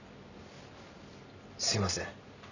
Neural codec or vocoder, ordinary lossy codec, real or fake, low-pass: none; none; real; 7.2 kHz